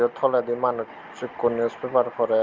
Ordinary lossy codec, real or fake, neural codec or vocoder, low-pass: Opus, 24 kbps; real; none; 7.2 kHz